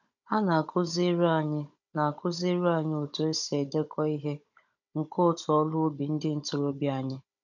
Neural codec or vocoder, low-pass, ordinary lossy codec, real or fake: codec, 16 kHz, 16 kbps, FunCodec, trained on Chinese and English, 50 frames a second; 7.2 kHz; none; fake